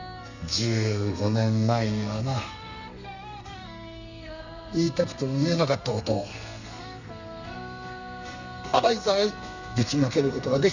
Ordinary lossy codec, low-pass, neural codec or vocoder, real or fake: none; 7.2 kHz; codec, 32 kHz, 1.9 kbps, SNAC; fake